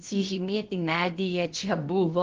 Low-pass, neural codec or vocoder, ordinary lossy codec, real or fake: 7.2 kHz; codec, 16 kHz, about 1 kbps, DyCAST, with the encoder's durations; Opus, 16 kbps; fake